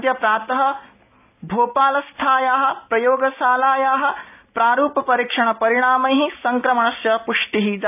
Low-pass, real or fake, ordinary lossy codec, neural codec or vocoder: 3.6 kHz; real; none; none